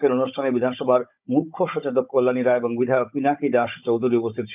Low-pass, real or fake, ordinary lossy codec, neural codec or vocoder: 3.6 kHz; fake; none; codec, 16 kHz, 16 kbps, FunCodec, trained on Chinese and English, 50 frames a second